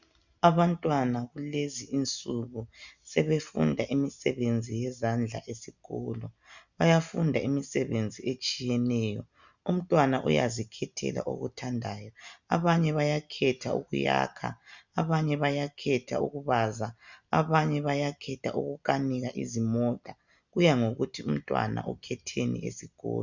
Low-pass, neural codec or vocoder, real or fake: 7.2 kHz; none; real